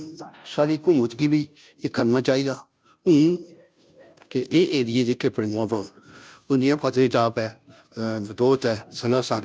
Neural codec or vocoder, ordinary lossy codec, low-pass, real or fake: codec, 16 kHz, 0.5 kbps, FunCodec, trained on Chinese and English, 25 frames a second; none; none; fake